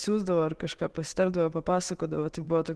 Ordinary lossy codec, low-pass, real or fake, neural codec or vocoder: Opus, 16 kbps; 10.8 kHz; fake; autoencoder, 48 kHz, 128 numbers a frame, DAC-VAE, trained on Japanese speech